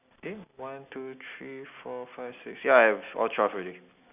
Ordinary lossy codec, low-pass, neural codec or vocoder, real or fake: none; 3.6 kHz; none; real